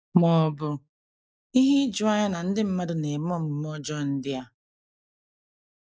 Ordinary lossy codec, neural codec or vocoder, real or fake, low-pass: none; none; real; none